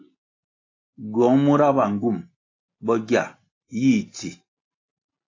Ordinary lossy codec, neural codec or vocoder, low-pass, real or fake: AAC, 32 kbps; none; 7.2 kHz; real